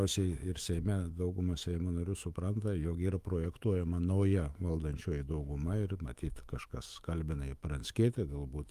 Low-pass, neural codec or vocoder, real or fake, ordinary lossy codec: 14.4 kHz; autoencoder, 48 kHz, 128 numbers a frame, DAC-VAE, trained on Japanese speech; fake; Opus, 32 kbps